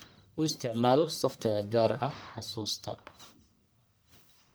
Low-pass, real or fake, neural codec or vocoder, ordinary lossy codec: none; fake; codec, 44.1 kHz, 1.7 kbps, Pupu-Codec; none